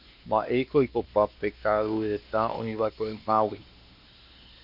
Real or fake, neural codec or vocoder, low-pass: fake; codec, 16 kHz, 2 kbps, X-Codec, WavLM features, trained on Multilingual LibriSpeech; 5.4 kHz